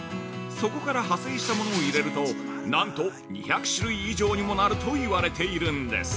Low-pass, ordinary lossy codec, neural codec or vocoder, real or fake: none; none; none; real